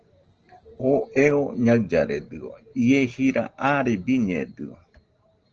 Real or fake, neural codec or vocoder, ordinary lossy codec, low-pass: real; none; Opus, 16 kbps; 7.2 kHz